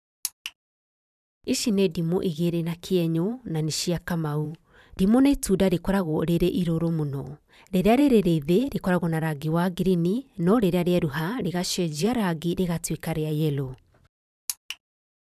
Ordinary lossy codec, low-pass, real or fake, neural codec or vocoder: none; 14.4 kHz; fake; vocoder, 44.1 kHz, 128 mel bands every 512 samples, BigVGAN v2